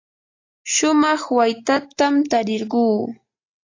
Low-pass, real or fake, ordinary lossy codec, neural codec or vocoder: 7.2 kHz; real; AAC, 32 kbps; none